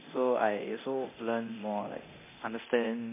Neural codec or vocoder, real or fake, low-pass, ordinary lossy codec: codec, 24 kHz, 0.9 kbps, DualCodec; fake; 3.6 kHz; MP3, 24 kbps